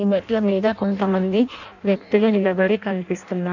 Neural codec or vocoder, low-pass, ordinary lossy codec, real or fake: codec, 16 kHz in and 24 kHz out, 0.6 kbps, FireRedTTS-2 codec; 7.2 kHz; none; fake